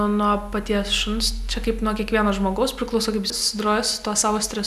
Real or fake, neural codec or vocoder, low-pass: real; none; 14.4 kHz